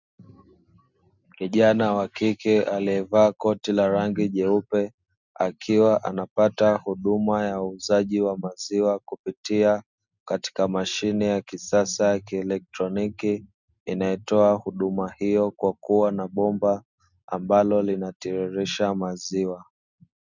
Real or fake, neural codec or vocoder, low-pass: real; none; 7.2 kHz